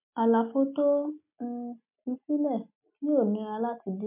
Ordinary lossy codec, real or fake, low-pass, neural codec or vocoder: MP3, 32 kbps; real; 3.6 kHz; none